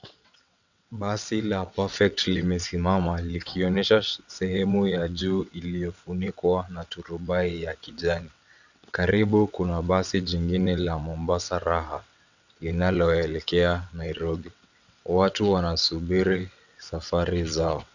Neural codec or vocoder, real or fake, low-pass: vocoder, 22.05 kHz, 80 mel bands, WaveNeXt; fake; 7.2 kHz